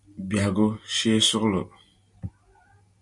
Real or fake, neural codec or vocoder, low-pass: real; none; 10.8 kHz